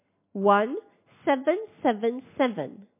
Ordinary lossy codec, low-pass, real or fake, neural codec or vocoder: MP3, 24 kbps; 3.6 kHz; real; none